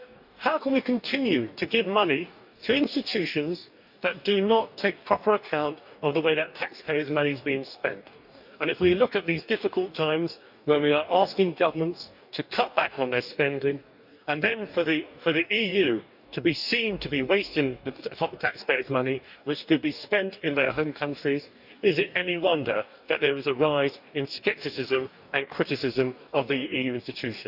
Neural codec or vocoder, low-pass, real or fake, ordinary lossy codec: codec, 44.1 kHz, 2.6 kbps, DAC; 5.4 kHz; fake; none